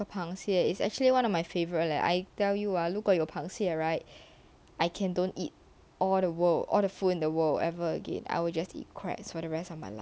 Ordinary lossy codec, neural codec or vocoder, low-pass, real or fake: none; none; none; real